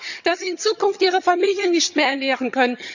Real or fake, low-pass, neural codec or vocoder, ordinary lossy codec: fake; 7.2 kHz; vocoder, 22.05 kHz, 80 mel bands, HiFi-GAN; none